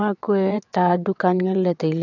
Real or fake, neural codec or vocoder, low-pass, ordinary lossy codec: fake; vocoder, 22.05 kHz, 80 mel bands, WaveNeXt; 7.2 kHz; none